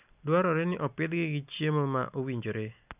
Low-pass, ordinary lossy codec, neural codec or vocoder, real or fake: 3.6 kHz; none; none; real